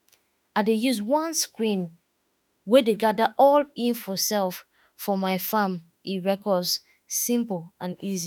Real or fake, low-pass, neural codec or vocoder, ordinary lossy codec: fake; none; autoencoder, 48 kHz, 32 numbers a frame, DAC-VAE, trained on Japanese speech; none